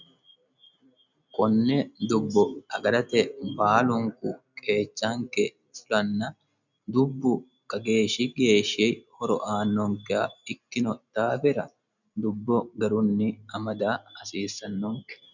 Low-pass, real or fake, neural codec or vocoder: 7.2 kHz; real; none